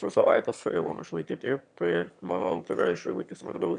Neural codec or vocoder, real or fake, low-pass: autoencoder, 22.05 kHz, a latent of 192 numbers a frame, VITS, trained on one speaker; fake; 9.9 kHz